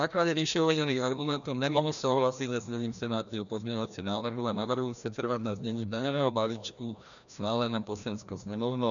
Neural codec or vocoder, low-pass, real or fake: codec, 16 kHz, 1 kbps, FreqCodec, larger model; 7.2 kHz; fake